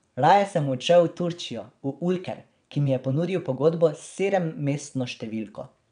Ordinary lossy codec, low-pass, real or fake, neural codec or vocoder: none; 9.9 kHz; fake; vocoder, 22.05 kHz, 80 mel bands, WaveNeXt